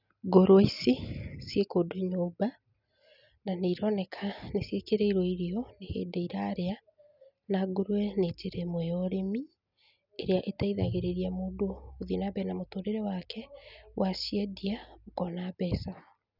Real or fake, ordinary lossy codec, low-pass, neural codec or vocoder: real; none; 5.4 kHz; none